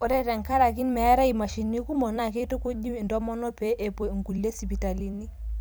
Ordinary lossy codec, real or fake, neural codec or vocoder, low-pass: none; fake; vocoder, 44.1 kHz, 128 mel bands every 256 samples, BigVGAN v2; none